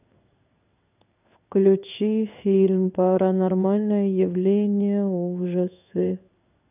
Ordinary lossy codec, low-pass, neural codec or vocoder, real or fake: none; 3.6 kHz; codec, 16 kHz in and 24 kHz out, 1 kbps, XY-Tokenizer; fake